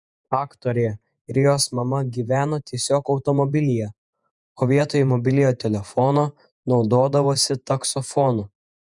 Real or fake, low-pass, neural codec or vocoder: fake; 10.8 kHz; vocoder, 48 kHz, 128 mel bands, Vocos